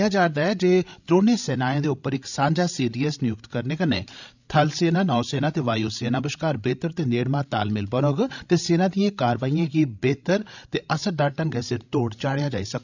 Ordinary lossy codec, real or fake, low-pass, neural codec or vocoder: none; fake; 7.2 kHz; codec, 16 kHz, 16 kbps, FreqCodec, larger model